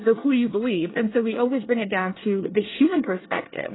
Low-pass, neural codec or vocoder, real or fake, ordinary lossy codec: 7.2 kHz; codec, 24 kHz, 1 kbps, SNAC; fake; AAC, 16 kbps